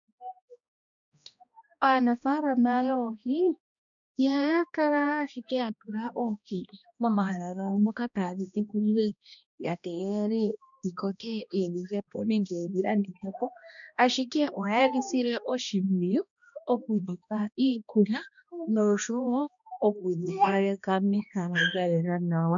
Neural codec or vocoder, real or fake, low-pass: codec, 16 kHz, 1 kbps, X-Codec, HuBERT features, trained on balanced general audio; fake; 7.2 kHz